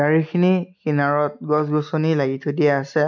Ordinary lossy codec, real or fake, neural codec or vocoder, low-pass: none; real; none; 7.2 kHz